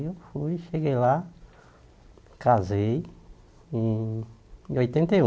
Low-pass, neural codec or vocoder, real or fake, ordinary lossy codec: none; none; real; none